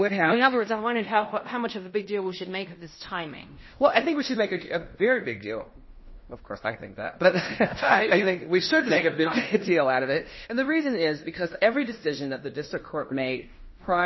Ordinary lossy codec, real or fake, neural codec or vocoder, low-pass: MP3, 24 kbps; fake; codec, 16 kHz in and 24 kHz out, 0.9 kbps, LongCat-Audio-Codec, fine tuned four codebook decoder; 7.2 kHz